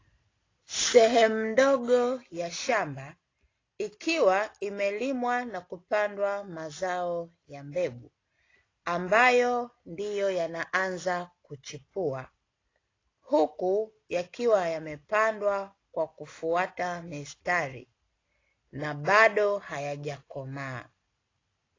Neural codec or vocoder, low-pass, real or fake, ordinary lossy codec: none; 7.2 kHz; real; AAC, 32 kbps